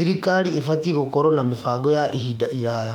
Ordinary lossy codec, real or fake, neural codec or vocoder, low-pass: none; fake; autoencoder, 48 kHz, 32 numbers a frame, DAC-VAE, trained on Japanese speech; 19.8 kHz